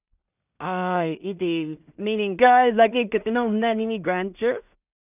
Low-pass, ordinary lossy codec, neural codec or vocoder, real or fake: 3.6 kHz; none; codec, 16 kHz in and 24 kHz out, 0.4 kbps, LongCat-Audio-Codec, two codebook decoder; fake